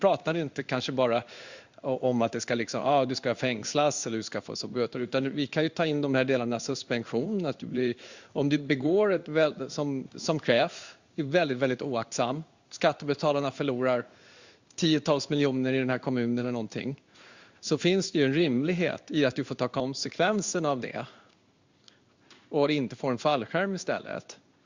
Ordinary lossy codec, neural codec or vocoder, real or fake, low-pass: Opus, 64 kbps; codec, 16 kHz in and 24 kHz out, 1 kbps, XY-Tokenizer; fake; 7.2 kHz